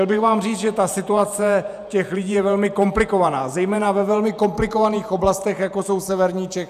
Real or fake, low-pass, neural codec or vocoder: fake; 14.4 kHz; vocoder, 48 kHz, 128 mel bands, Vocos